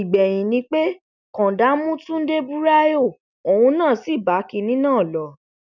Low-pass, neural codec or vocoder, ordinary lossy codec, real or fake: 7.2 kHz; none; none; real